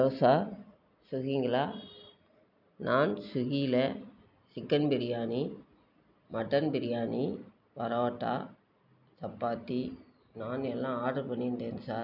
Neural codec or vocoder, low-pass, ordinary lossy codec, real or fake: none; 5.4 kHz; none; real